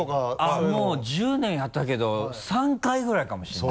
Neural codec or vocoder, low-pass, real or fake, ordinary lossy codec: none; none; real; none